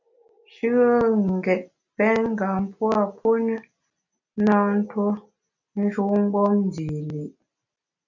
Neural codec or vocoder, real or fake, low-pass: none; real; 7.2 kHz